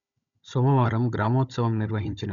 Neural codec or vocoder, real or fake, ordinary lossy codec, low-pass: codec, 16 kHz, 16 kbps, FunCodec, trained on Chinese and English, 50 frames a second; fake; none; 7.2 kHz